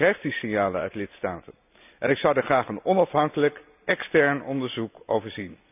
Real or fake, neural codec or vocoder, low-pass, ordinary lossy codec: real; none; 3.6 kHz; none